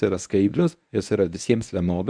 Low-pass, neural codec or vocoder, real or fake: 9.9 kHz; codec, 24 kHz, 0.9 kbps, WavTokenizer, medium speech release version 1; fake